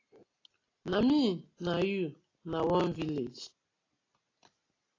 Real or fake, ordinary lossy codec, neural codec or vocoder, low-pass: real; AAC, 32 kbps; none; 7.2 kHz